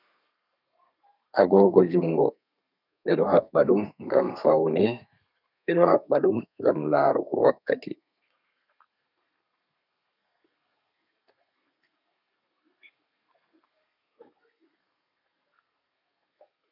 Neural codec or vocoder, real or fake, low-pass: codec, 32 kHz, 1.9 kbps, SNAC; fake; 5.4 kHz